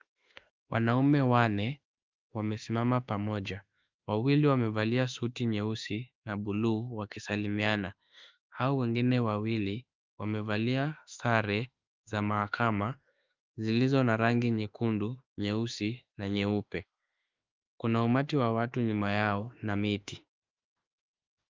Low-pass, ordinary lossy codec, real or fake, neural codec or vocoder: 7.2 kHz; Opus, 24 kbps; fake; autoencoder, 48 kHz, 32 numbers a frame, DAC-VAE, trained on Japanese speech